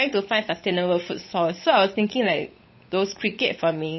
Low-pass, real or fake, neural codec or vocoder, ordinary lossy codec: 7.2 kHz; fake; codec, 16 kHz, 8 kbps, FunCodec, trained on Chinese and English, 25 frames a second; MP3, 24 kbps